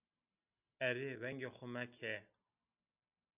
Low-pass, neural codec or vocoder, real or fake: 3.6 kHz; none; real